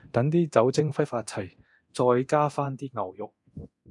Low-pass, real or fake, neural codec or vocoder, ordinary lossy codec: 10.8 kHz; fake; codec, 24 kHz, 0.9 kbps, DualCodec; AAC, 64 kbps